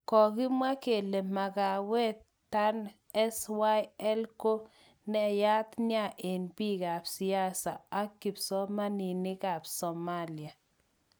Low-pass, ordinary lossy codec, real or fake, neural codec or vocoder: none; none; real; none